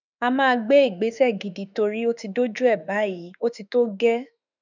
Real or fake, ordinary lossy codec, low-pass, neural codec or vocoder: fake; none; 7.2 kHz; autoencoder, 48 kHz, 32 numbers a frame, DAC-VAE, trained on Japanese speech